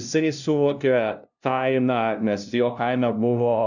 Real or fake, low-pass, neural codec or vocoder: fake; 7.2 kHz; codec, 16 kHz, 0.5 kbps, FunCodec, trained on LibriTTS, 25 frames a second